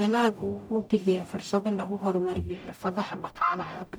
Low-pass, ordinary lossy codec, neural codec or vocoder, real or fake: none; none; codec, 44.1 kHz, 0.9 kbps, DAC; fake